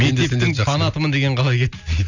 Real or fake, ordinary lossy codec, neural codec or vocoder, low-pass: real; none; none; 7.2 kHz